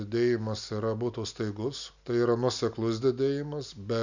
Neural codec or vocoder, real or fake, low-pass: none; real; 7.2 kHz